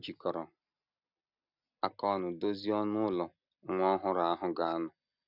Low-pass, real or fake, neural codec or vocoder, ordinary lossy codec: 5.4 kHz; real; none; none